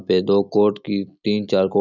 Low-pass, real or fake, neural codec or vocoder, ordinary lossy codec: 7.2 kHz; real; none; none